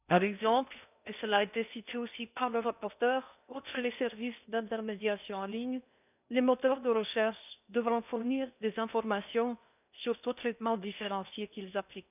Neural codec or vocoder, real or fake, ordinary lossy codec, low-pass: codec, 16 kHz in and 24 kHz out, 0.6 kbps, FocalCodec, streaming, 2048 codes; fake; none; 3.6 kHz